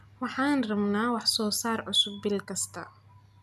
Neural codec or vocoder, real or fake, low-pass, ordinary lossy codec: none; real; 14.4 kHz; none